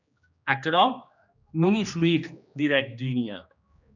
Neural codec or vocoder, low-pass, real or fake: codec, 16 kHz, 2 kbps, X-Codec, HuBERT features, trained on general audio; 7.2 kHz; fake